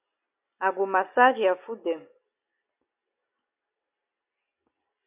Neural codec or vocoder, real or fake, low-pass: none; real; 3.6 kHz